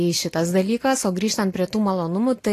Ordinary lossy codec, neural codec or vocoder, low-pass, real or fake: AAC, 48 kbps; none; 14.4 kHz; real